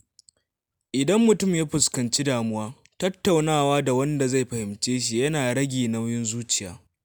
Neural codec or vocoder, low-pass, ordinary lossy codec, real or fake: none; none; none; real